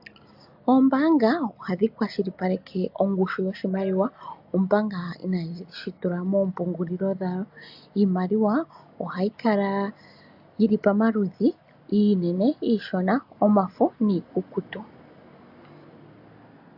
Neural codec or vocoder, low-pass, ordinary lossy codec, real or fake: none; 5.4 kHz; AAC, 48 kbps; real